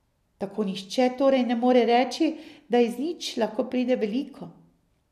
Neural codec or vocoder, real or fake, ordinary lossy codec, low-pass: none; real; none; 14.4 kHz